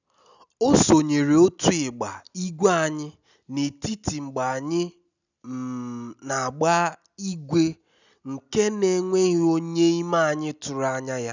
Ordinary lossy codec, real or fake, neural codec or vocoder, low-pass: none; real; none; 7.2 kHz